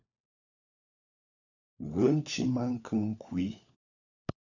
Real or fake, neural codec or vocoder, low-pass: fake; codec, 16 kHz, 4 kbps, FunCodec, trained on LibriTTS, 50 frames a second; 7.2 kHz